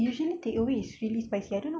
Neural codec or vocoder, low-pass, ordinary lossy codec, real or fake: none; none; none; real